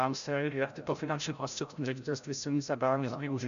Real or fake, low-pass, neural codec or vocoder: fake; 7.2 kHz; codec, 16 kHz, 0.5 kbps, FreqCodec, larger model